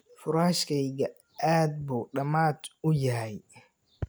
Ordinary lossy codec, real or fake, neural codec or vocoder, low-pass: none; real; none; none